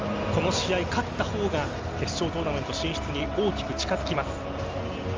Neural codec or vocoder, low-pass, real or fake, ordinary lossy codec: none; 7.2 kHz; real; Opus, 32 kbps